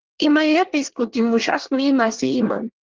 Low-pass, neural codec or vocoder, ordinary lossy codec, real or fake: 7.2 kHz; codec, 24 kHz, 1 kbps, SNAC; Opus, 16 kbps; fake